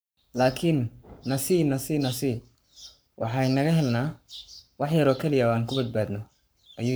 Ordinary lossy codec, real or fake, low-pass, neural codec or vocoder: none; fake; none; codec, 44.1 kHz, 7.8 kbps, Pupu-Codec